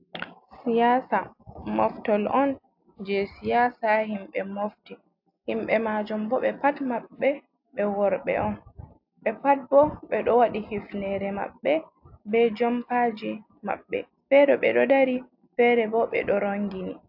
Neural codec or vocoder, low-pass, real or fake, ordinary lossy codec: none; 5.4 kHz; real; AAC, 48 kbps